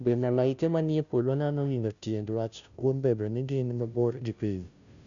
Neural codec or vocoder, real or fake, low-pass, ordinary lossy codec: codec, 16 kHz, 0.5 kbps, FunCodec, trained on Chinese and English, 25 frames a second; fake; 7.2 kHz; none